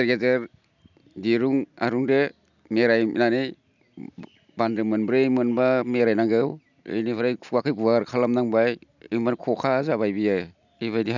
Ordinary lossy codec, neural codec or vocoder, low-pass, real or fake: none; none; 7.2 kHz; real